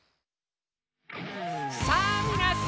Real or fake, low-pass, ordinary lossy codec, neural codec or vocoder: real; none; none; none